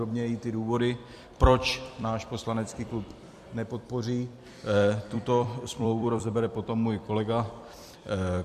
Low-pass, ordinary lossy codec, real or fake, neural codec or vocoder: 14.4 kHz; MP3, 64 kbps; fake; vocoder, 44.1 kHz, 128 mel bands every 256 samples, BigVGAN v2